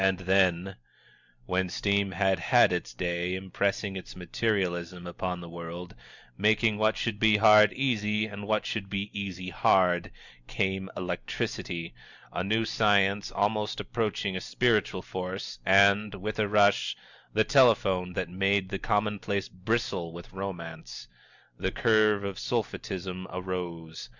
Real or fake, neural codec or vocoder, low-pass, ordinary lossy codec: real; none; 7.2 kHz; Opus, 64 kbps